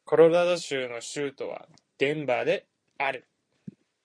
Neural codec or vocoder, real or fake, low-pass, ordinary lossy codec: vocoder, 44.1 kHz, 128 mel bands every 256 samples, BigVGAN v2; fake; 9.9 kHz; MP3, 48 kbps